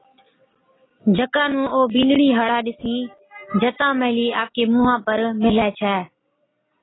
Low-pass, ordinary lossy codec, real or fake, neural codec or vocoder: 7.2 kHz; AAC, 16 kbps; real; none